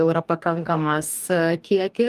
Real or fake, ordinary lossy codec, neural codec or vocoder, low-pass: fake; Opus, 32 kbps; codec, 44.1 kHz, 2.6 kbps, DAC; 14.4 kHz